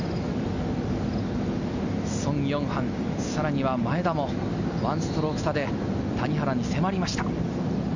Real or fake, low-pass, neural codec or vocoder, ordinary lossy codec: real; 7.2 kHz; none; none